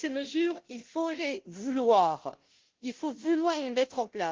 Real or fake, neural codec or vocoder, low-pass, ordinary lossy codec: fake; codec, 16 kHz, 0.5 kbps, FunCodec, trained on Chinese and English, 25 frames a second; 7.2 kHz; Opus, 16 kbps